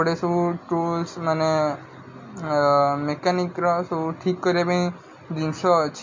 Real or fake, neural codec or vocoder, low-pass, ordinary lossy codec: real; none; 7.2 kHz; MP3, 48 kbps